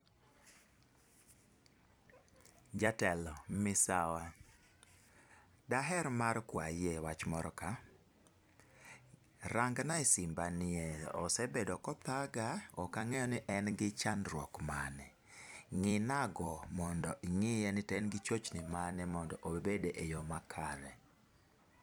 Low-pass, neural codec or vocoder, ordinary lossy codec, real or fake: none; vocoder, 44.1 kHz, 128 mel bands every 256 samples, BigVGAN v2; none; fake